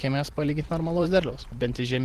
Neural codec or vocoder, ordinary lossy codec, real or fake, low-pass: vocoder, 44.1 kHz, 128 mel bands every 512 samples, BigVGAN v2; Opus, 16 kbps; fake; 14.4 kHz